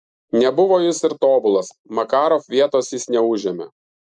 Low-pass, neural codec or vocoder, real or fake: 10.8 kHz; none; real